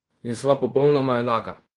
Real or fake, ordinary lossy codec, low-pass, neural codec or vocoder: fake; Opus, 24 kbps; 10.8 kHz; codec, 16 kHz in and 24 kHz out, 0.9 kbps, LongCat-Audio-Codec, fine tuned four codebook decoder